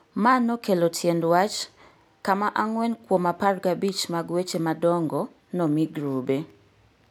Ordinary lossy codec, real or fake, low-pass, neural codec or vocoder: none; real; none; none